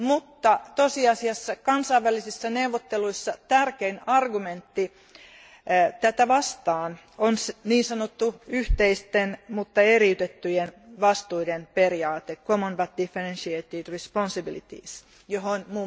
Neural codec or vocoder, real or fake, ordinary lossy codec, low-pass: none; real; none; none